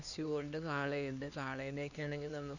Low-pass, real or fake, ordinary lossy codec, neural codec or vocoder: 7.2 kHz; fake; none; codec, 16 kHz, 2 kbps, X-Codec, HuBERT features, trained on LibriSpeech